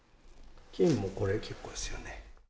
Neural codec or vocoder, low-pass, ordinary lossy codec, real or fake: none; none; none; real